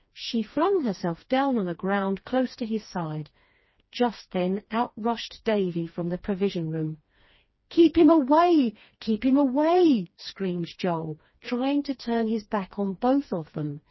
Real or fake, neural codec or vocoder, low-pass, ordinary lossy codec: fake; codec, 16 kHz, 2 kbps, FreqCodec, smaller model; 7.2 kHz; MP3, 24 kbps